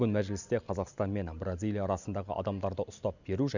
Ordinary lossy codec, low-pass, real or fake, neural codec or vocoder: none; 7.2 kHz; real; none